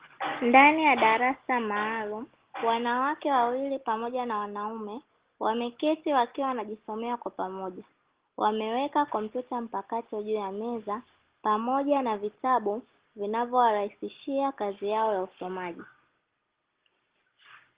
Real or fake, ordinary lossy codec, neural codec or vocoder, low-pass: real; Opus, 16 kbps; none; 3.6 kHz